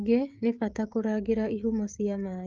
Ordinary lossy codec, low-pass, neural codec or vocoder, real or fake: Opus, 32 kbps; 7.2 kHz; codec, 16 kHz, 16 kbps, FreqCodec, smaller model; fake